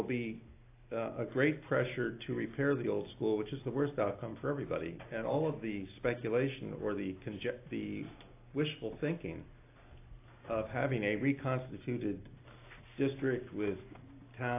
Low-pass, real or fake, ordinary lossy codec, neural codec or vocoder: 3.6 kHz; real; AAC, 24 kbps; none